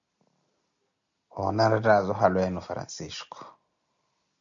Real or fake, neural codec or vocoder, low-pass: real; none; 7.2 kHz